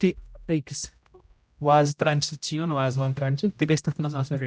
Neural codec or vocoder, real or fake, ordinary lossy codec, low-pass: codec, 16 kHz, 0.5 kbps, X-Codec, HuBERT features, trained on general audio; fake; none; none